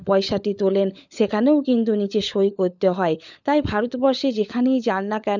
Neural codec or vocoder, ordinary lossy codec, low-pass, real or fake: codec, 16 kHz, 16 kbps, FunCodec, trained on LibriTTS, 50 frames a second; none; 7.2 kHz; fake